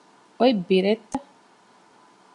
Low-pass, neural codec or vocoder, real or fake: 10.8 kHz; none; real